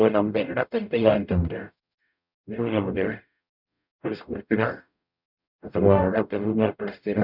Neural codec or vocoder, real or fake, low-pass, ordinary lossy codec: codec, 44.1 kHz, 0.9 kbps, DAC; fake; 5.4 kHz; none